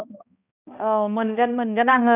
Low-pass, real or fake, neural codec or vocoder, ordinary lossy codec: 3.6 kHz; fake; codec, 16 kHz, 1 kbps, X-Codec, HuBERT features, trained on balanced general audio; none